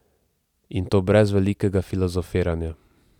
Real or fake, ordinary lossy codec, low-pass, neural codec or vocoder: real; none; 19.8 kHz; none